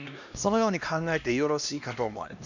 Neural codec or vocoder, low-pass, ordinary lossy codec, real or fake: codec, 16 kHz, 1 kbps, X-Codec, HuBERT features, trained on LibriSpeech; 7.2 kHz; none; fake